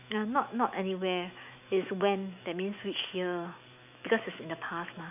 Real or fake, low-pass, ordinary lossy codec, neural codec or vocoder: fake; 3.6 kHz; none; autoencoder, 48 kHz, 128 numbers a frame, DAC-VAE, trained on Japanese speech